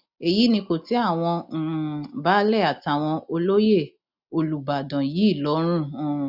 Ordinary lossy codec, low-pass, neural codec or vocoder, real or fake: none; 5.4 kHz; none; real